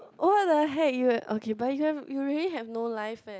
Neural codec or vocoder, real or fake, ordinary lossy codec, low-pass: none; real; none; none